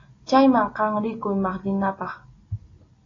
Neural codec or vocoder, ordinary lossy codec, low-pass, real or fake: none; AAC, 32 kbps; 7.2 kHz; real